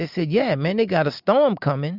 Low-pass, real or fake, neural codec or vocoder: 5.4 kHz; real; none